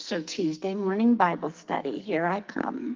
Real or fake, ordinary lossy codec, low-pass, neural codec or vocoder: fake; Opus, 32 kbps; 7.2 kHz; codec, 44.1 kHz, 2.6 kbps, SNAC